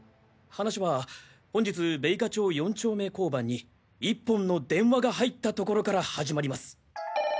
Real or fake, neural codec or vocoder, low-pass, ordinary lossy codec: real; none; none; none